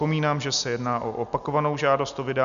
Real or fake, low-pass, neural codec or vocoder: real; 7.2 kHz; none